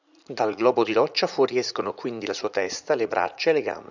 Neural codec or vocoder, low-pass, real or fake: vocoder, 44.1 kHz, 80 mel bands, Vocos; 7.2 kHz; fake